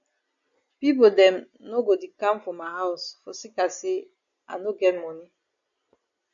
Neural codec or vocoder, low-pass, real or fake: none; 7.2 kHz; real